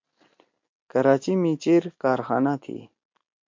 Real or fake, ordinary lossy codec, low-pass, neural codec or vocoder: real; MP3, 48 kbps; 7.2 kHz; none